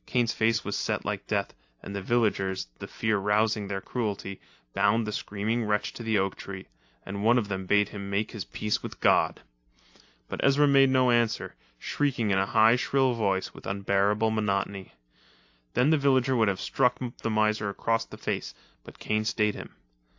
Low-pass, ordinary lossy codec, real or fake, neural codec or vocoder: 7.2 kHz; AAC, 48 kbps; real; none